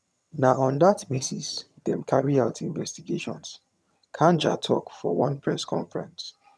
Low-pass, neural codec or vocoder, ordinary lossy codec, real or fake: none; vocoder, 22.05 kHz, 80 mel bands, HiFi-GAN; none; fake